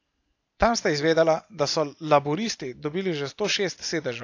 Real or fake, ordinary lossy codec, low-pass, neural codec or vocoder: real; AAC, 48 kbps; 7.2 kHz; none